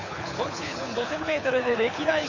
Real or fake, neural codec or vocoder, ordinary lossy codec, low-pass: fake; codec, 24 kHz, 6 kbps, HILCodec; AAC, 48 kbps; 7.2 kHz